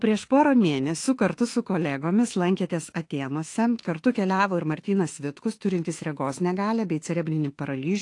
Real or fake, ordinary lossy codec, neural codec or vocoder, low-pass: fake; AAC, 48 kbps; autoencoder, 48 kHz, 32 numbers a frame, DAC-VAE, trained on Japanese speech; 10.8 kHz